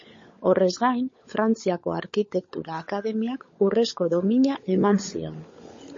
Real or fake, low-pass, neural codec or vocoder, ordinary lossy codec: fake; 7.2 kHz; codec, 16 kHz, 16 kbps, FunCodec, trained on LibriTTS, 50 frames a second; MP3, 32 kbps